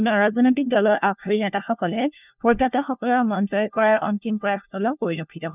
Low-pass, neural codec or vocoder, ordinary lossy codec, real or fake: 3.6 kHz; codec, 16 kHz, 1 kbps, FunCodec, trained on LibriTTS, 50 frames a second; none; fake